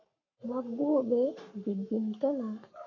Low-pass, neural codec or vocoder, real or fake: 7.2 kHz; codec, 44.1 kHz, 7.8 kbps, Pupu-Codec; fake